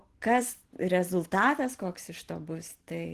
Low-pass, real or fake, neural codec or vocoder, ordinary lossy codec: 14.4 kHz; real; none; Opus, 16 kbps